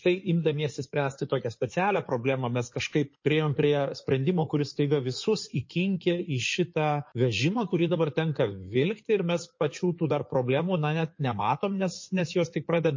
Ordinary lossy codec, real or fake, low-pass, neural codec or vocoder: MP3, 32 kbps; fake; 7.2 kHz; codec, 16 kHz in and 24 kHz out, 2.2 kbps, FireRedTTS-2 codec